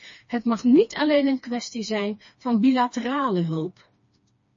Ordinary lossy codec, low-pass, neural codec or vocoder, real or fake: MP3, 32 kbps; 7.2 kHz; codec, 16 kHz, 2 kbps, FreqCodec, smaller model; fake